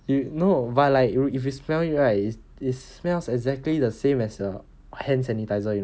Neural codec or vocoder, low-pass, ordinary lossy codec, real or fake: none; none; none; real